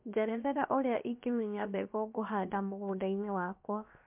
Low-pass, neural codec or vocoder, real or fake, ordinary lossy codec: 3.6 kHz; codec, 16 kHz, 0.7 kbps, FocalCodec; fake; MP3, 32 kbps